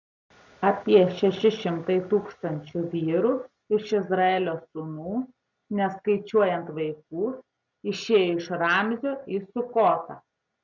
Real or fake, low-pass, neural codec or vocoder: real; 7.2 kHz; none